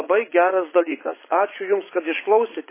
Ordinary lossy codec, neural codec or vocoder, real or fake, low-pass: MP3, 16 kbps; vocoder, 22.05 kHz, 80 mel bands, Vocos; fake; 3.6 kHz